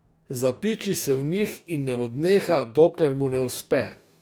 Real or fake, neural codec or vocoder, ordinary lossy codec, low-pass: fake; codec, 44.1 kHz, 2.6 kbps, DAC; none; none